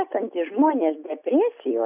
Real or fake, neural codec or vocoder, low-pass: real; none; 3.6 kHz